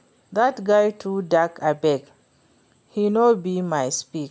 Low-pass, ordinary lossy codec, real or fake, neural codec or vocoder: none; none; real; none